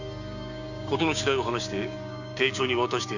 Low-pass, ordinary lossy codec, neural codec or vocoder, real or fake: 7.2 kHz; none; codec, 16 kHz, 6 kbps, DAC; fake